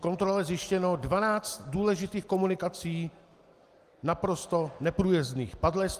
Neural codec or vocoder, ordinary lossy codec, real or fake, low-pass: none; Opus, 24 kbps; real; 14.4 kHz